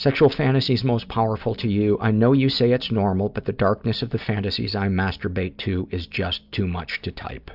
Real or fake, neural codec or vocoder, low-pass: real; none; 5.4 kHz